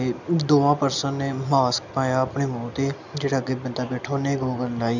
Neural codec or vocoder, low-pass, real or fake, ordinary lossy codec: none; 7.2 kHz; real; none